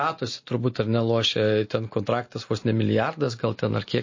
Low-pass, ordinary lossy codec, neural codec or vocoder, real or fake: 7.2 kHz; MP3, 32 kbps; none; real